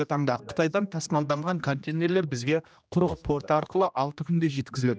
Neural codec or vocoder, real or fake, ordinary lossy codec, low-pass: codec, 16 kHz, 1 kbps, X-Codec, HuBERT features, trained on general audio; fake; none; none